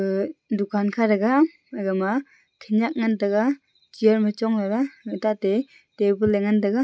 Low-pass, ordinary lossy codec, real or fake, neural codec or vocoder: none; none; real; none